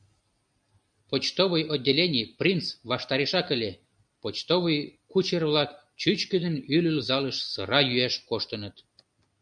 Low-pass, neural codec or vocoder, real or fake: 9.9 kHz; none; real